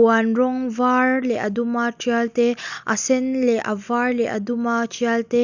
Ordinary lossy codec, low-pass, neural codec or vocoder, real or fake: none; 7.2 kHz; none; real